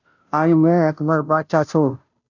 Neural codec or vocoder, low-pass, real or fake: codec, 16 kHz, 0.5 kbps, FunCodec, trained on Chinese and English, 25 frames a second; 7.2 kHz; fake